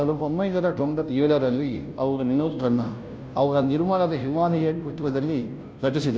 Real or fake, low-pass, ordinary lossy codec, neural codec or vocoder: fake; none; none; codec, 16 kHz, 0.5 kbps, FunCodec, trained on Chinese and English, 25 frames a second